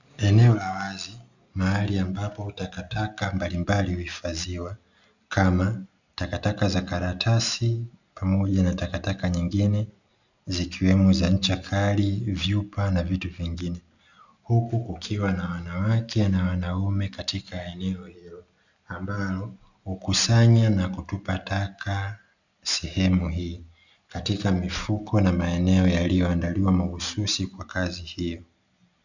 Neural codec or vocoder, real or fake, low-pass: none; real; 7.2 kHz